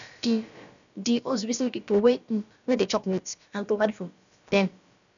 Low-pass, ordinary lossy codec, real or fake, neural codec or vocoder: 7.2 kHz; none; fake; codec, 16 kHz, about 1 kbps, DyCAST, with the encoder's durations